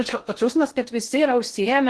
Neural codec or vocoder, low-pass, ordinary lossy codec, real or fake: codec, 16 kHz in and 24 kHz out, 0.6 kbps, FocalCodec, streaming, 2048 codes; 10.8 kHz; Opus, 16 kbps; fake